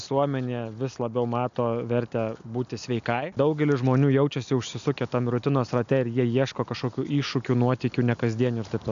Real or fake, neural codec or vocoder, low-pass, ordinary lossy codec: real; none; 7.2 kHz; AAC, 96 kbps